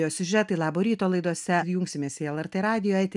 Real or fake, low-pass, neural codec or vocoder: real; 10.8 kHz; none